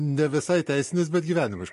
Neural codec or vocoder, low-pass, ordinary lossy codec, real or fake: none; 14.4 kHz; MP3, 48 kbps; real